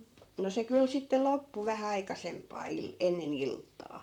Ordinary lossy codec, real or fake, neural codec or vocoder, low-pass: none; fake; vocoder, 44.1 kHz, 128 mel bands, Pupu-Vocoder; 19.8 kHz